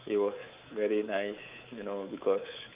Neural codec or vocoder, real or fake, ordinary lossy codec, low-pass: codec, 24 kHz, 3.1 kbps, DualCodec; fake; Opus, 24 kbps; 3.6 kHz